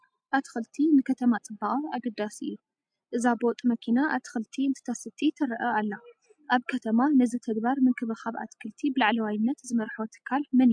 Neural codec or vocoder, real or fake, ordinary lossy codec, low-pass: none; real; MP3, 64 kbps; 9.9 kHz